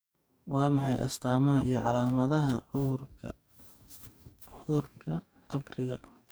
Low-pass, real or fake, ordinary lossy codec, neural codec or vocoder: none; fake; none; codec, 44.1 kHz, 2.6 kbps, DAC